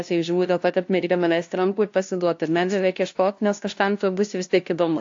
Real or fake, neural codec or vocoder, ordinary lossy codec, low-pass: fake; codec, 16 kHz, 0.5 kbps, FunCodec, trained on LibriTTS, 25 frames a second; AAC, 48 kbps; 7.2 kHz